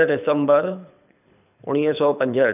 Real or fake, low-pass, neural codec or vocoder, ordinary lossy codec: fake; 3.6 kHz; codec, 24 kHz, 6 kbps, HILCodec; none